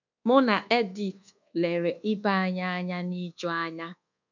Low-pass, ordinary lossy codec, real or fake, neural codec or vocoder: 7.2 kHz; none; fake; codec, 24 kHz, 1.2 kbps, DualCodec